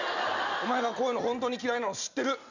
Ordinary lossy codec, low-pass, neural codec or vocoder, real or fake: none; 7.2 kHz; none; real